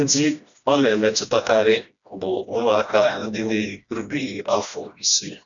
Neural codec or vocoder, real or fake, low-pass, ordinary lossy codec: codec, 16 kHz, 1 kbps, FreqCodec, smaller model; fake; 7.2 kHz; none